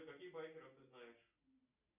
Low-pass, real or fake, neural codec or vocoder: 3.6 kHz; real; none